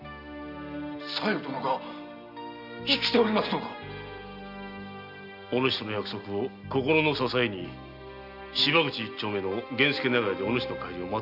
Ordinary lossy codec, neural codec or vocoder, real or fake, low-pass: none; none; real; 5.4 kHz